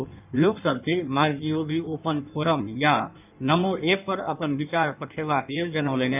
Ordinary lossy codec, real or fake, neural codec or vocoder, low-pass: none; fake; codec, 16 kHz in and 24 kHz out, 1.1 kbps, FireRedTTS-2 codec; 3.6 kHz